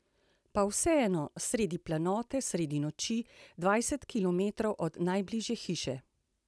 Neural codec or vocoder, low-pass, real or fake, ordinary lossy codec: none; none; real; none